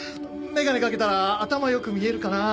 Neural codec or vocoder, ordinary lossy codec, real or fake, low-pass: none; none; real; none